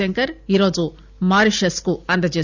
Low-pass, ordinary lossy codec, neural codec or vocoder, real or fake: 7.2 kHz; none; none; real